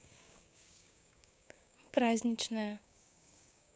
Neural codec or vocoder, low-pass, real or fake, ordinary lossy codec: codec, 16 kHz, 6 kbps, DAC; none; fake; none